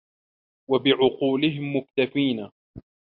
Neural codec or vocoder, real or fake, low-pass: none; real; 5.4 kHz